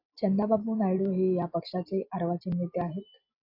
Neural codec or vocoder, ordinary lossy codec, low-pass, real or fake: none; AAC, 32 kbps; 5.4 kHz; real